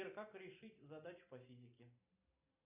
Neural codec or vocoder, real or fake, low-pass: none; real; 3.6 kHz